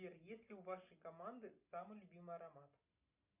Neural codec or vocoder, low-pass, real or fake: none; 3.6 kHz; real